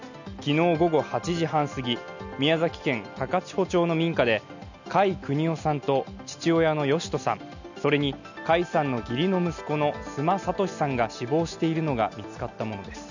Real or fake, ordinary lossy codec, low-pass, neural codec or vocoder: real; none; 7.2 kHz; none